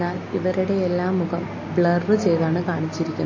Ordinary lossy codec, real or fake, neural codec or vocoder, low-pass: MP3, 32 kbps; real; none; 7.2 kHz